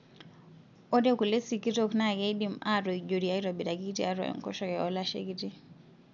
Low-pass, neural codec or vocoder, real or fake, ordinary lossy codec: 7.2 kHz; none; real; none